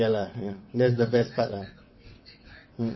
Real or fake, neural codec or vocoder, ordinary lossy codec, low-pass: fake; vocoder, 22.05 kHz, 80 mel bands, WaveNeXt; MP3, 24 kbps; 7.2 kHz